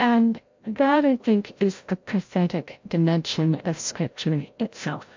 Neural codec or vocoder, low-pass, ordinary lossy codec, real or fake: codec, 16 kHz, 0.5 kbps, FreqCodec, larger model; 7.2 kHz; MP3, 48 kbps; fake